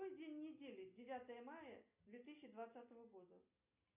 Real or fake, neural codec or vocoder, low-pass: real; none; 3.6 kHz